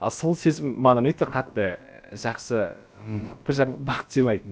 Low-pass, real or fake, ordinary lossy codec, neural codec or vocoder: none; fake; none; codec, 16 kHz, about 1 kbps, DyCAST, with the encoder's durations